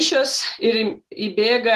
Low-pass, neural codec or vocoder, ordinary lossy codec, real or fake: 14.4 kHz; none; Opus, 16 kbps; real